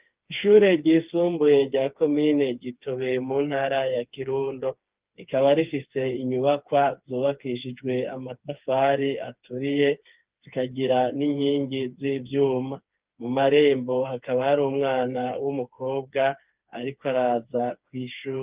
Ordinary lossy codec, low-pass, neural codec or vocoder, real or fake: Opus, 32 kbps; 3.6 kHz; codec, 16 kHz, 4 kbps, FreqCodec, smaller model; fake